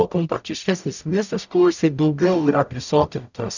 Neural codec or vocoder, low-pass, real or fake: codec, 44.1 kHz, 0.9 kbps, DAC; 7.2 kHz; fake